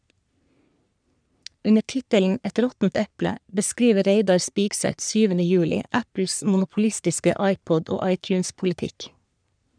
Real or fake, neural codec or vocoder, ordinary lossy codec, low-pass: fake; codec, 44.1 kHz, 3.4 kbps, Pupu-Codec; none; 9.9 kHz